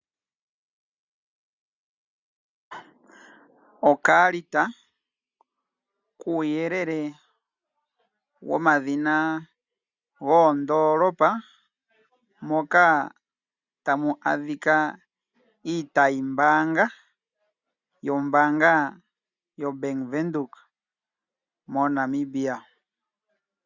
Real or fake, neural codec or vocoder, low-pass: real; none; 7.2 kHz